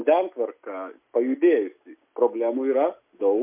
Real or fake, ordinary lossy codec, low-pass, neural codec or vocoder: real; MP3, 32 kbps; 3.6 kHz; none